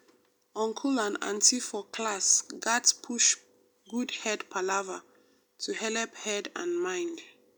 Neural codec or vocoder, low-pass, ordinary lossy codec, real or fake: none; none; none; real